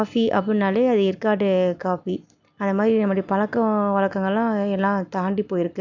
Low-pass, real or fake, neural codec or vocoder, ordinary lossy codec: 7.2 kHz; real; none; none